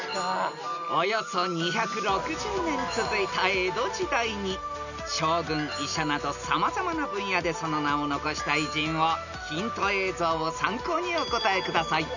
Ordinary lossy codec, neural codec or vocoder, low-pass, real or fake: none; none; 7.2 kHz; real